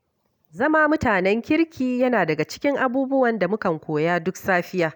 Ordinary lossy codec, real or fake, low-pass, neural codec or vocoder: none; real; 19.8 kHz; none